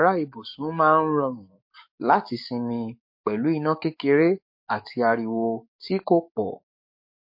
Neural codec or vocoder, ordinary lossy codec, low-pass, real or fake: codec, 44.1 kHz, 7.8 kbps, DAC; MP3, 32 kbps; 5.4 kHz; fake